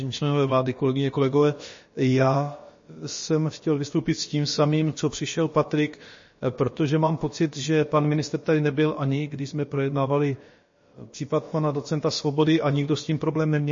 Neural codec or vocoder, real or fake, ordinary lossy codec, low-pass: codec, 16 kHz, about 1 kbps, DyCAST, with the encoder's durations; fake; MP3, 32 kbps; 7.2 kHz